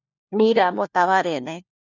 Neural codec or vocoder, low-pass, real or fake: codec, 16 kHz, 1 kbps, FunCodec, trained on LibriTTS, 50 frames a second; 7.2 kHz; fake